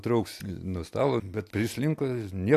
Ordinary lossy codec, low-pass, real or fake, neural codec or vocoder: AAC, 64 kbps; 14.4 kHz; real; none